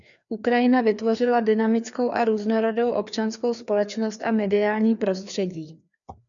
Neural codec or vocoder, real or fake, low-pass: codec, 16 kHz, 2 kbps, FreqCodec, larger model; fake; 7.2 kHz